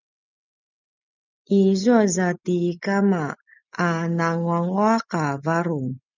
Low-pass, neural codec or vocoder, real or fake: 7.2 kHz; none; real